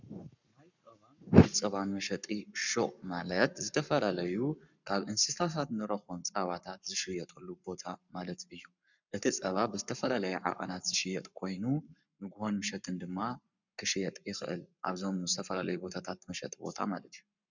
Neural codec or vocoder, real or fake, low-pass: codec, 44.1 kHz, 7.8 kbps, Pupu-Codec; fake; 7.2 kHz